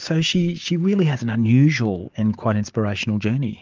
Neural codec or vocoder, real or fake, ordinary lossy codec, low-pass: codec, 16 kHz, 6 kbps, DAC; fake; Opus, 24 kbps; 7.2 kHz